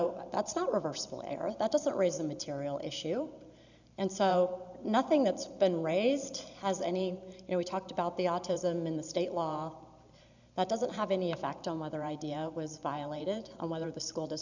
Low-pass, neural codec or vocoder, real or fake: 7.2 kHz; vocoder, 44.1 kHz, 128 mel bands every 512 samples, BigVGAN v2; fake